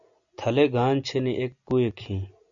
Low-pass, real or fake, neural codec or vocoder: 7.2 kHz; real; none